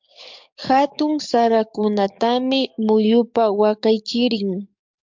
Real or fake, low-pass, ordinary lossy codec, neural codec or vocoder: fake; 7.2 kHz; MP3, 64 kbps; codec, 44.1 kHz, 7.8 kbps, DAC